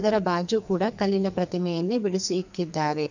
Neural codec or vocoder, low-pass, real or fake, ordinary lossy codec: codec, 44.1 kHz, 2.6 kbps, SNAC; 7.2 kHz; fake; none